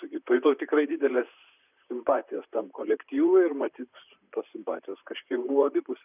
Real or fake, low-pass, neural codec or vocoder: fake; 3.6 kHz; vocoder, 44.1 kHz, 128 mel bands, Pupu-Vocoder